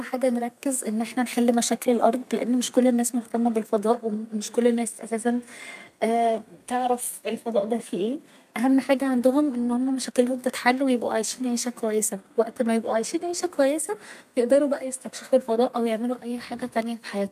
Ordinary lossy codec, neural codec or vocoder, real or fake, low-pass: none; codec, 32 kHz, 1.9 kbps, SNAC; fake; 14.4 kHz